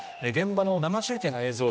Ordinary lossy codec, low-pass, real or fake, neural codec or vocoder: none; none; fake; codec, 16 kHz, 1 kbps, X-Codec, HuBERT features, trained on general audio